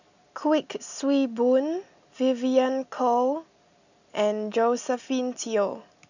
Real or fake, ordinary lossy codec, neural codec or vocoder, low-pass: real; none; none; 7.2 kHz